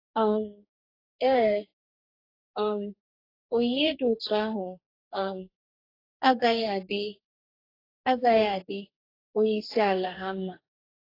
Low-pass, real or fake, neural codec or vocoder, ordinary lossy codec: 5.4 kHz; fake; codec, 44.1 kHz, 2.6 kbps, DAC; AAC, 24 kbps